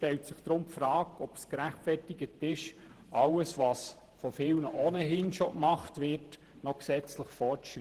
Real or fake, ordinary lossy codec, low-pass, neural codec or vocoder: fake; Opus, 16 kbps; 14.4 kHz; vocoder, 48 kHz, 128 mel bands, Vocos